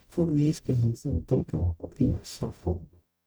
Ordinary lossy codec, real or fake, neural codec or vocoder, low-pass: none; fake; codec, 44.1 kHz, 0.9 kbps, DAC; none